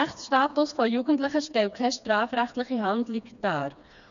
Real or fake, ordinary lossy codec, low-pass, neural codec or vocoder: fake; none; 7.2 kHz; codec, 16 kHz, 2 kbps, FreqCodec, smaller model